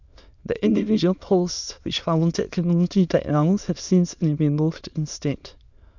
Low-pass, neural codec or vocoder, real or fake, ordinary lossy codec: 7.2 kHz; autoencoder, 22.05 kHz, a latent of 192 numbers a frame, VITS, trained on many speakers; fake; none